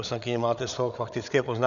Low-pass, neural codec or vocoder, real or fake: 7.2 kHz; codec, 16 kHz, 8 kbps, FreqCodec, larger model; fake